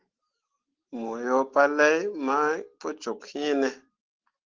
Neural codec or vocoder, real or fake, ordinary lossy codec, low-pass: autoencoder, 48 kHz, 128 numbers a frame, DAC-VAE, trained on Japanese speech; fake; Opus, 16 kbps; 7.2 kHz